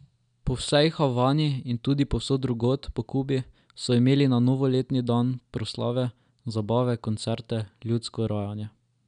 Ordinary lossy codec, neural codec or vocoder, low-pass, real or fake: none; none; 9.9 kHz; real